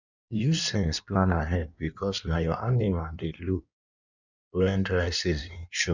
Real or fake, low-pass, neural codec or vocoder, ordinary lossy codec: fake; 7.2 kHz; codec, 16 kHz in and 24 kHz out, 1.1 kbps, FireRedTTS-2 codec; none